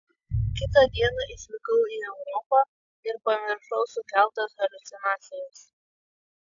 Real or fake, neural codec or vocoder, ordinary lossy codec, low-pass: real; none; AAC, 64 kbps; 7.2 kHz